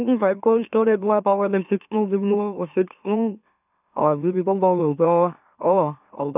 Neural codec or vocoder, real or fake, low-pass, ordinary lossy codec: autoencoder, 44.1 kHz, a latent of 192 numbers a frame, MeloTTS; fake; 3.6 kHz; none